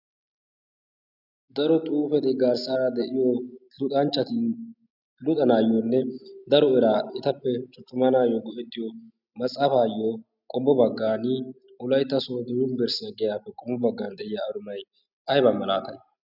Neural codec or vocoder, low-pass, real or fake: none; 5.4 kHz; real